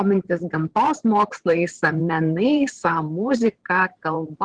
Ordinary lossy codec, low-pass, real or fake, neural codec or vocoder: Opus, 16 kbps; 9.9 kHz; fake; vocoder, 44.1 kHz, 128 mel bands every 512 samples, BigVGAN v2